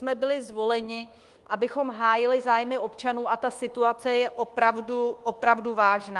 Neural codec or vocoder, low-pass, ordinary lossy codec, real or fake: codec, 24 kHz, 1.2 kbps, DualCodec; 10.8 kHz; Opus, 24 kbps; fake